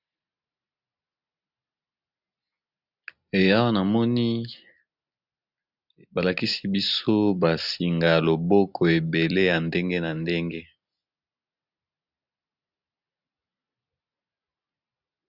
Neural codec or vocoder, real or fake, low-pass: none; real; 5.4 kHz